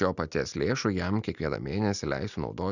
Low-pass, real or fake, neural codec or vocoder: 7.2 kHz; real; none